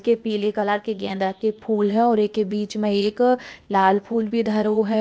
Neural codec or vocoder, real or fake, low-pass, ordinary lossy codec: codec, 16 kHz, 0.8 kbps, ZipCodec; fake; none; none